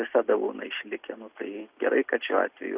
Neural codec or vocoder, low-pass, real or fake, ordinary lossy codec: none; 3.6 kHz; real; Opus, 64 kbps